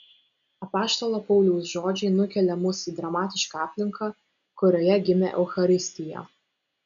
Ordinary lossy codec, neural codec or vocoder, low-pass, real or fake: MP3, 96 kbps; none; 7.2 kHz; real